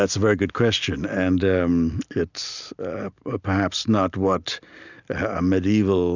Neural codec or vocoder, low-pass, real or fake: none; 7.2 kHz; real